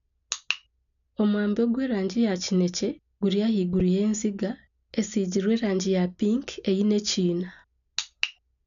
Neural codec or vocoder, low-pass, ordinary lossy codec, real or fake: none; 7.2 kHz; none; real